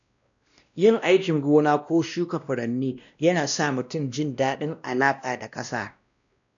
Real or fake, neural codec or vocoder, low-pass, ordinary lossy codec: fake; codec, 16 kHz, 1 kbps, X-Codec, WavLM features, trained on Multilingual LibriSpeech; 7.2 kHz; none